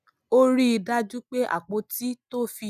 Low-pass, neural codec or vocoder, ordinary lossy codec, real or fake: 14.4 kHz; none; none; real